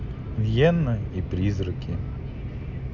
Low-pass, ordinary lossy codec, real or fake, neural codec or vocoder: 7.2 kHz; none; real; none